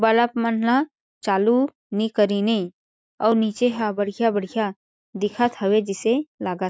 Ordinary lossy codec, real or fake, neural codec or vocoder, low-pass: none; real; none; none